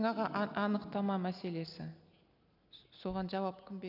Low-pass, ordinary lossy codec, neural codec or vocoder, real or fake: 5.4 kHz; none; none; real